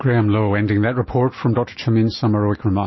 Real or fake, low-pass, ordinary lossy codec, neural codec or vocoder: real; 7.2 kHz; MP3, 24 kbps; none